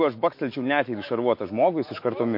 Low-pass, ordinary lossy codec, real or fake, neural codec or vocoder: 5.4 kHz; MP3, 32 kbps; fake; autoencoder, 48 kHz, 128 numbers a frame, DAC-VAE, trained on Japanese speech